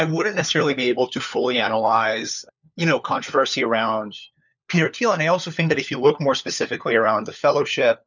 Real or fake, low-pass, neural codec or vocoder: fake; 7.2 kHz; codec, 16 kHz, 4 kbps, FunCodec, trained on LibriTTS, 50 frames a second